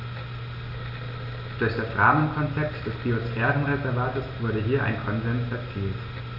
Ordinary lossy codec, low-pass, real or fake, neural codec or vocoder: none; 5.4 kHz; real; none